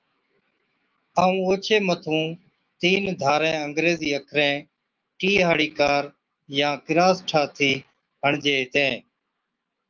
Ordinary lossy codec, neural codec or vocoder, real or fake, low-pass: Opus, 24 kbps; autoencoder, 48 kHz, 128 numbers a frame, DAC-VAE, trained on Japanese speech; fake; 7.2 kHz